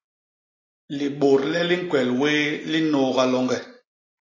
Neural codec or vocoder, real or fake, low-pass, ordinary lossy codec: none; real; 7.2 kHz; AAC, 32 kbps